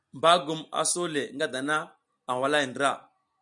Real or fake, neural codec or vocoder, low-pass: real; none; 10.8 kHz